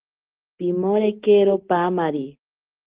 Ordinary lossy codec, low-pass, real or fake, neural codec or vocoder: Opus, 16 kbps; 3.6 kHz; real; none